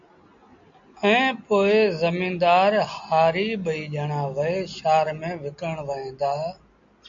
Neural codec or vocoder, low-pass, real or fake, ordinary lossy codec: none; 7.2 kHz; real; AAC, 64 kbps